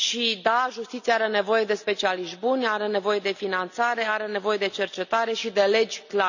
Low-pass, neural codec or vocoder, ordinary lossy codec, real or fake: 7.2 kHz; none; none; real